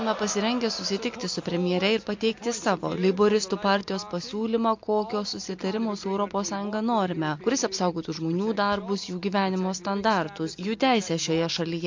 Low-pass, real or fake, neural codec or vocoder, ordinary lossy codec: 7.2 kHz; real; none; MP3, 48 kbps